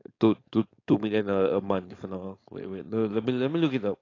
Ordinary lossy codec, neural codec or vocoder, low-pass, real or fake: AAC, 32 kbps; codec, 16 kHz, 4.8 kbps, FACodec; 7.2 kHz; fake